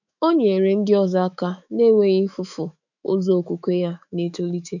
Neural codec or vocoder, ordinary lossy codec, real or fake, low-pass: autoencoder, 48 kHz, 128 numbers a frame, DAC-VAE, trained on Japanese speech; none; fake; 7.2 kHz